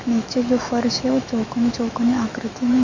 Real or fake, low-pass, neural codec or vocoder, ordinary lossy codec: fake; 7.2 kHz; autoencoder, 48 kHz, 128 numbers a frame, DAC-VAE, trained on Japanese speech; MP3, 64 kbps